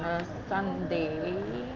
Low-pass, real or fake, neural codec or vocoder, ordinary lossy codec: 7.2 kHz; real; none; Opus, 24 kbps